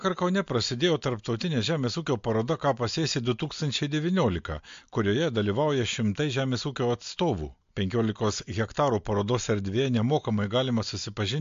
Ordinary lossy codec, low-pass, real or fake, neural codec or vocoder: MP3, 48 kbps; 7.2 kHz; real; none